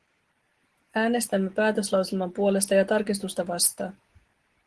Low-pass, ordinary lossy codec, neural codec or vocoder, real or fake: 10.8 kHz; Opus, 16 kbps; none; real